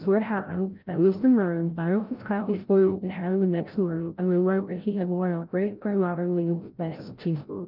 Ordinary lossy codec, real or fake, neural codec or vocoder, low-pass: Opus, 32 kbps; fake; codec, 16 kHz, 0.5 kbps, FreqCodec, larger model; 5.4 kHz